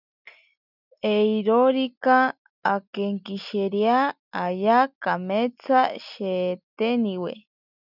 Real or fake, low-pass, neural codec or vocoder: real; 5.4 kHz; none